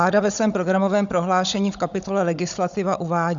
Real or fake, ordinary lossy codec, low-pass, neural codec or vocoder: fake; Opus, 64 kbps; 7.2 kHz; codec, 16 kHz, 16 kbps, FunCodec, trained on Chinese and English, 50 frames a second